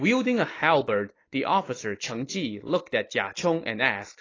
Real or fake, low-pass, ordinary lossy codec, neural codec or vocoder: real; 7.2 kHz; AAC, 32 kbps; none